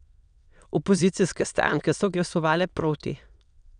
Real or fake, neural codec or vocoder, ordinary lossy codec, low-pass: fake; autoencoder, 22.05 kHz, a latent of 192 numbers a frame, VITS, trained on many speakers; none; 9.9 kHz